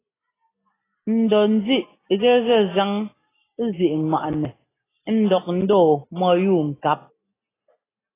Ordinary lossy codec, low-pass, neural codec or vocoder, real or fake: AAC, 16 kbps; 3.6 kHz; none; real